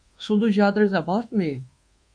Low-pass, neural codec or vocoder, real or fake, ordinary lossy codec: 9.9 kHz; codec, 24 kHz, 1.2 kbps, DualCodec; fake; MP3, 48 kbps